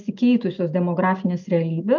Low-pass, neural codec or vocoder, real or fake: 7.2 kHz; none; real